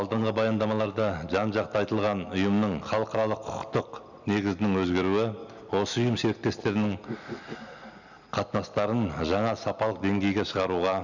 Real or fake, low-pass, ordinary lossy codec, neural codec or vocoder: real; 7.2 kHz; none; none